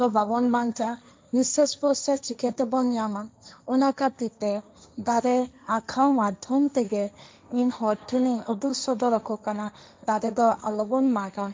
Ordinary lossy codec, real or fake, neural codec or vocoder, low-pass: none; fake; codec, 16 kHz, 1.1 kbps, Voila-Tokenizer; none